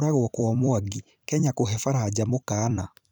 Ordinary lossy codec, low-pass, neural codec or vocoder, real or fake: none; none; vocoder, 44.1 kHz, 128 mel bands every 256 samples, BigVGAN v2; fake